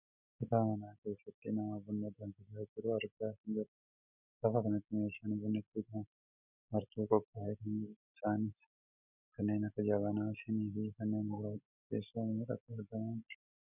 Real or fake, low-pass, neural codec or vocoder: real; 3.6 kHz; none